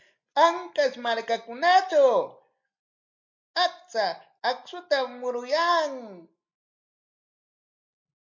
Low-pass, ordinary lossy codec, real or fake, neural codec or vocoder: 7.2 kHz; MP3, 48 kbps; real; none